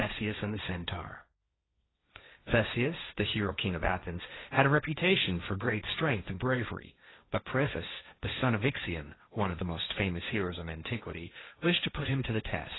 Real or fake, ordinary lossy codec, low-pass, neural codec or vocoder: fake; AAC, 16 kbps; 7.2 kHz; codec, 16 kHz, 1.1 kbps, Voila-Tokenizer